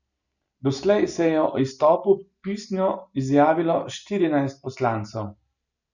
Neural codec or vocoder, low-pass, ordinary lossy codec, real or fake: none; 7.2 kHz; none; real